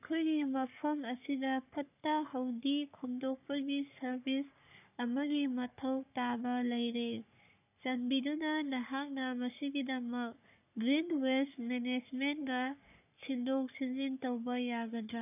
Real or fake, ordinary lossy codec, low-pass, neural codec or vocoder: fake; none; 3.6 kHz; codec, 44.1 kHz, 3.4 kbps, Pupu-Codec